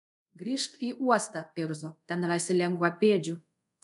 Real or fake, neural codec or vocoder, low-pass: fake; codec, 24 kHz, 0.5 kbps, DualCodec; 10.8 kHz